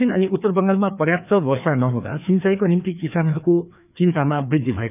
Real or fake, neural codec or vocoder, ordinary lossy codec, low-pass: fake; codec, 16 kHz, 2 kbps, FreqCodec, larger model; none; 3.6 kHz